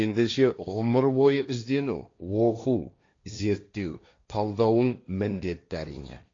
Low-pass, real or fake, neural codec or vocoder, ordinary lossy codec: 7.2 kHz; fake; codec, 16 kHz, 1.1 kbps, Voila-Tokenizer; AAC, 48 kbps